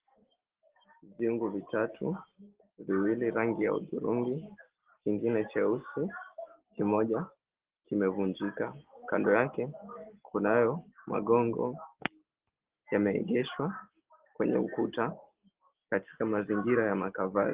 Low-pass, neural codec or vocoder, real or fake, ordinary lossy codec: 3.6 kHz; none; real; Opus, 16 kbps